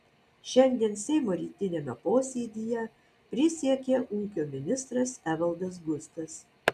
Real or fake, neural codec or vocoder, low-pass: real; none; 14.4 kHz